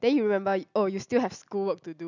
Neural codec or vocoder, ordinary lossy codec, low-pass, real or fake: none; none; 7.2 kHz; real